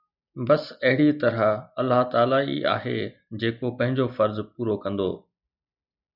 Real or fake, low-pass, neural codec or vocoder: real; 5.4 kHz; none